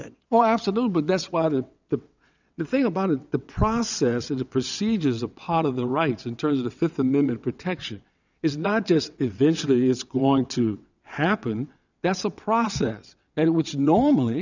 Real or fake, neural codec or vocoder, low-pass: fake; vocoder, 22.05 kHz, 80 mel bands, WaveNeXt; 7.2 kHz